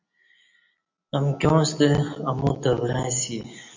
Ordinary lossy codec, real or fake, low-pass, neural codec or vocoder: MP3, 48 kbps; real; 7.2 kHz; none